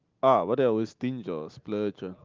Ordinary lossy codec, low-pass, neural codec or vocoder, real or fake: Opus, 32 kbps; 7.2 kHz; none; real